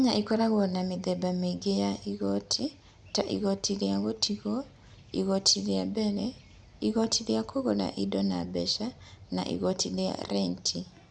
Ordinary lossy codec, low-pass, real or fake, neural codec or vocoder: none; 9.9 kHz; real; none